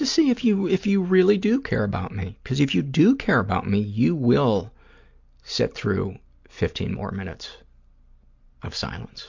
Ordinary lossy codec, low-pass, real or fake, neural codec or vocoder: MP3, 64 kbps; 7.2 kHz; real; none